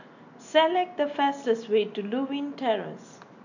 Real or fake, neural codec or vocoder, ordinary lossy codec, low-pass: real; none; none; 7.2 kHz